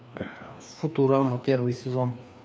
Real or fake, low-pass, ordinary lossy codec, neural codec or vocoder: fake; none; none; codec, 16 kHz, 2 kbps, FreqCodec, larger model